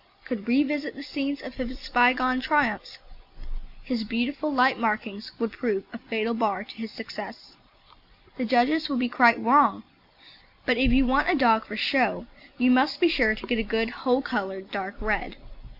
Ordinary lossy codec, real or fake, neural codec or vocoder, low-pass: AAC, 48 kbps; real; none; 5.4 kHz